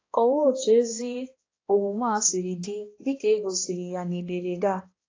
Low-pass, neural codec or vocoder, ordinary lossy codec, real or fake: 7.2 kHz; codec, 16 kHz, 1 kbps, X-Codec, HuBERT features, trained on balanced general audio; AAC, 32 kbps; fake